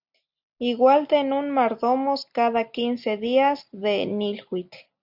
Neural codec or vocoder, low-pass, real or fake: none; 5.4 kHz; real